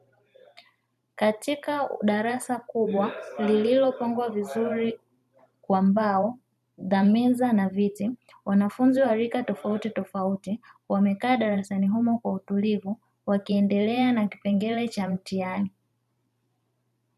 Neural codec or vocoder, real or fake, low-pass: vocoder, 44.1 kHz, 128 mel bands every 512 samples, BigVGAN v2; fake; 14.4 kHz